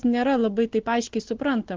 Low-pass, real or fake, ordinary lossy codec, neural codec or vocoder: 7.2 kHz; real; Opus, 16 kbps; none